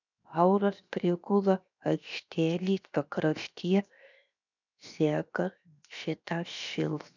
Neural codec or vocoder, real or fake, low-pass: codec, 16 kHz, 0.7 kbps, FocalCodec; fake; 7.2 kHz